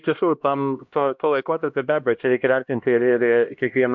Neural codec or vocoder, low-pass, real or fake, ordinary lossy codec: codec, 16 kHz, 1 kbps, X-Codec, HuBERT features, trained on LibriSpeech; 7.2 kHz; fake; MP3, 64 kbps